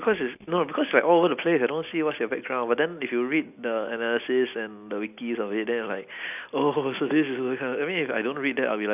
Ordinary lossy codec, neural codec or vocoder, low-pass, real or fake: none; none; 3.6 kHz; real